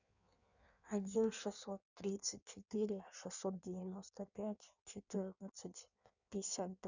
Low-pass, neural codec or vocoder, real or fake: 7.2 kHz; codec, 16 kHz in and 24 kHz out, 1.1 kbps, FireRedTTS-2 codec; fake